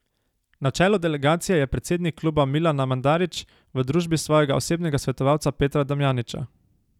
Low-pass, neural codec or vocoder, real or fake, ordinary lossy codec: 19.8 kHz; none; real; none